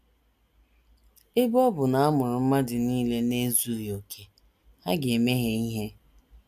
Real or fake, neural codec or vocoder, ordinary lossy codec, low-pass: real; none; none; 14.4 kHz